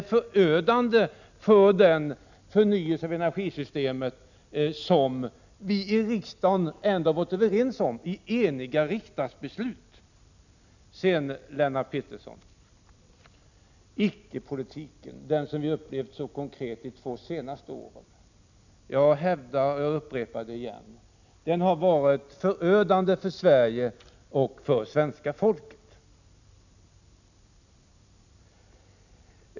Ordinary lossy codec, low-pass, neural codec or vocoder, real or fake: none; 7.2 kHz; none; real